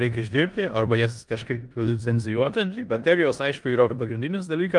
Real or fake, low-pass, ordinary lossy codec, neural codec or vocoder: fake; 10.8 kHz; Opus, 32 kbps; codec, 16 kHz in and 24 kHz out, 0.9 kbps, LongCat-Audio-Codec, four codebook decoder